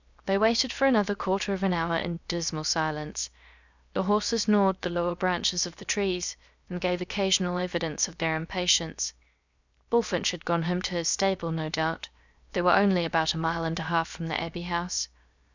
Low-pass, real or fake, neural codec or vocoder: 7.2 kHz; fake; codec, 16 kHz, 0.7 kbps, FocalCodec